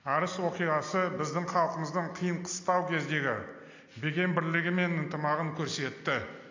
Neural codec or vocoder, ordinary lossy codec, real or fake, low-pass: none; AAC, 48 kbps; real; 7.2 kHz